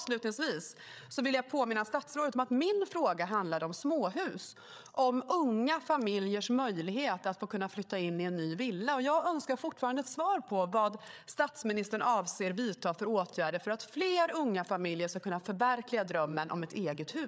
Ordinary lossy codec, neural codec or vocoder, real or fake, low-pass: none; codec, 16 kHz, 8 kbps, FreqCodec, larger model; fake; none